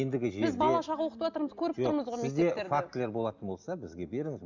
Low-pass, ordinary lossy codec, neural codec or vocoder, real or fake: 7.2 kHz; none; none; real